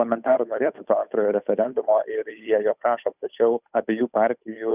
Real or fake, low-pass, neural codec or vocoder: fake; 3.6 kHz; codec, 16 kHz, 8 kbps, FunCodec, trained on Chinese and English, 25 frames a second